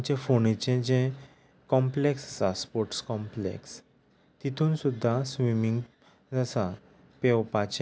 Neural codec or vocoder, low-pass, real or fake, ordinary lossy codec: none; none; real; none